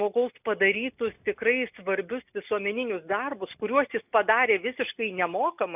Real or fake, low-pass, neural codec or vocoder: real; 3.6 kHz; none